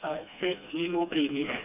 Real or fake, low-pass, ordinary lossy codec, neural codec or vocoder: fake; 3.6 kHz; none; codec, 16 kHz, 2 kbps, FreqCodec, smaller model